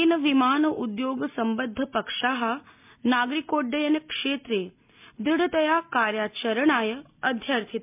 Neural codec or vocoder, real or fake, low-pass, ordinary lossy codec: none; real; 3.6 kHz; MP3, 32 kbps